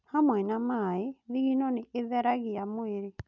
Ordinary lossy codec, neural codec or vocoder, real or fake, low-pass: none; none; real; 7.2 kHz